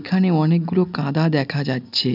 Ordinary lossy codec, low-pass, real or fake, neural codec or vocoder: none; 5.4 kHz; real; none